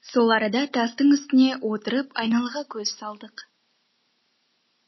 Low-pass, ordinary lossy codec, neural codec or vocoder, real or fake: 7.2 kHz; MP3, 24 kbps; none; real